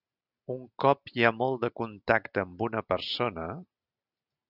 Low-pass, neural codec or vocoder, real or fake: 5.4 kHz; none; real